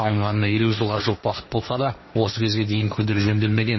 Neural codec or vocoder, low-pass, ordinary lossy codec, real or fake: codec, 16 kHz, 1.1 kbps, Voila-Tokenizer; 7.2 kHz; MP3, 24 kbps; fake